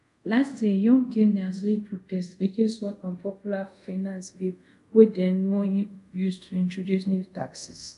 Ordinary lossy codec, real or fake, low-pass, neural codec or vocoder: none; fake; 10.8 kHz; codec, 24 kHz, 0.5 kbps, DualCodec